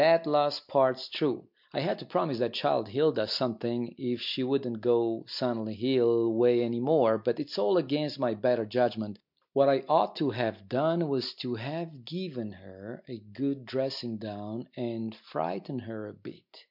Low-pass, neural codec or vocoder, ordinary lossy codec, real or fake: 5.4 kHz; none; MP3, 48 kbps; real